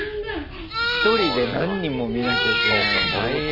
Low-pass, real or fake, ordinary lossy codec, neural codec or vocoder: 5.4 kHz; real; none; none